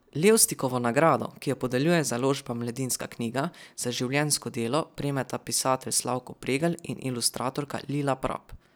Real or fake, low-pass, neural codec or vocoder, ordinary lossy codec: real; none; none; none